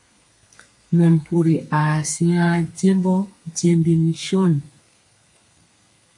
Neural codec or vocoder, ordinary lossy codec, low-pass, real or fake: codec, 32 kHz, 1.9 kbps, SNAC; MP3, 48 kbps; 10.8 kHz; fake